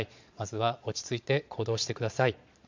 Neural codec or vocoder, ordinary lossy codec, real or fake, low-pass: vocoder, 22.05 kHz, 80 mel bands, Vocos; MP3, 64 kbps; fake; 7.2 kHz